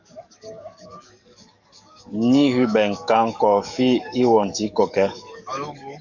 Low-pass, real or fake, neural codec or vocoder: 7.2 kHz; fake; codec, 44.1 kHz, 7.8 kbps, DAC